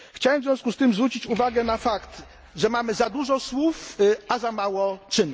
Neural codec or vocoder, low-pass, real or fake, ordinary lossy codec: none; none; real; none